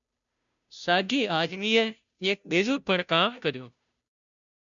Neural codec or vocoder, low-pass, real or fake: codec, 16 kHz, 0.5 kbps, FunCodec, trained on Chinese and English, 25 frames a second; 7.2 kHz; fake